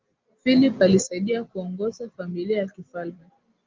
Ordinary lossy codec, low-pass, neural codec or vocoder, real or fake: Opus, 24 kbps; 7.2 kHz; none; real